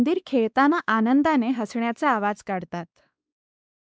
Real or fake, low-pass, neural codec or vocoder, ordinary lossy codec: fake; none; codec, 16 kHz, 2 kbps, X-Codec, WavLM features, trained on Multilingual LibriSpeech; none